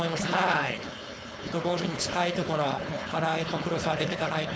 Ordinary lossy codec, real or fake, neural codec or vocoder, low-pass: none; fake; codec, 16 kHz, 4.8 kbps, FACodec; none